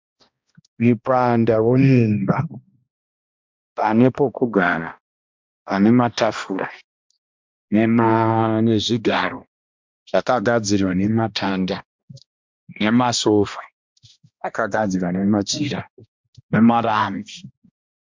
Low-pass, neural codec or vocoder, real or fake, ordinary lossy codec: 7.2 kHz; codec, 16 kHz, 1 kbps, X-Codec, HuBERT features, trained on balanced general audio; fake; MP3, 64 kbps